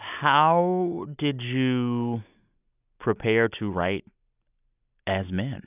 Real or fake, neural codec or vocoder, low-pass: real; none; 3.6 kHz